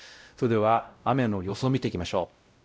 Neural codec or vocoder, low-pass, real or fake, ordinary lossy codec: codec, 16 kHz, 0.5 kbps, X-Codec, WavLM features, trained on Multilingual LibriSpeech; none; fake; none